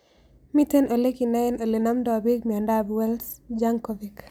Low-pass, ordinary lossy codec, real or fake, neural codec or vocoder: none; none; real; none